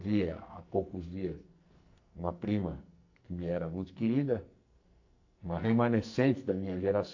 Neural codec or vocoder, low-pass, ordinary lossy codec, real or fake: codec, 44.1 kHz, 2.6 kbps, SNAC; 7.2 kHz; AAC, 48 kbps; fake